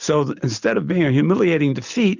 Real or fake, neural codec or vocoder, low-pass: real; none; 7.2 kHz